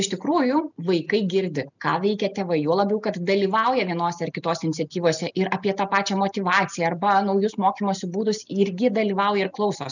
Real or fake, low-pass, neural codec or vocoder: real; 7.2 kHz; none